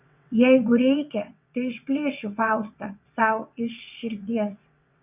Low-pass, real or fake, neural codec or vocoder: 3.6 kHz; fake; vocoder, 22.05 kHz, 80 mel bands, WaveNeXt